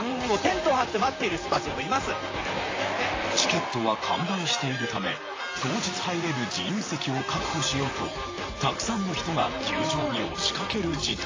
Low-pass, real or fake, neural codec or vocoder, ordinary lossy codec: 7.2 kHz; fake; vocoder, 44.1 kHz, 128 mel bands, Pupu-Vocoder; AAC, 32 kbps